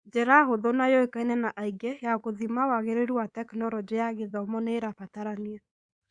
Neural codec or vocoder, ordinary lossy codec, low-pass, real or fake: codec, 24 kHz, 3.1 kbps, DualCodec; Opus, 64 kbps; 9.9 kHz; fake